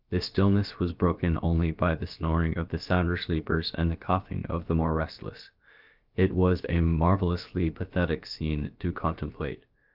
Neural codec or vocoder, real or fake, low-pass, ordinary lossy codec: codec, 16 kHz, about 1 kbps, DyCAST, with the encoder's durations; fake; 5.4 kHz; Opus, 32 kbps